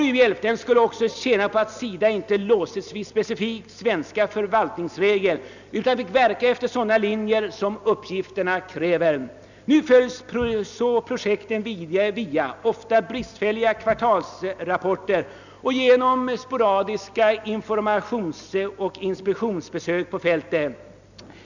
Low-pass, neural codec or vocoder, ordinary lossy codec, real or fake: 7.2 kHz; none; none; real